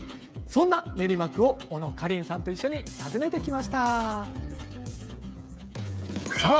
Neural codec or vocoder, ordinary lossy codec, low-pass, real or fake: codec, 16 kHz, 8 kbps, FreqCodec, smaller model; none; none; fake